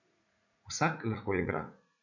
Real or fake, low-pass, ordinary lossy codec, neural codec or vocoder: fake; 7.2 kHz; none; vocoder, 44.1 kHz, 80 mel bands, Vocos